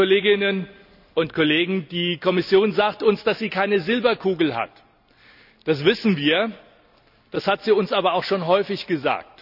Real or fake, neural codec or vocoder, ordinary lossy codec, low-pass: real; none; none; 5.4 kHz